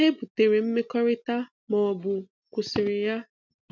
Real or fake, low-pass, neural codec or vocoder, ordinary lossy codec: real; 7.2 kHz; none; none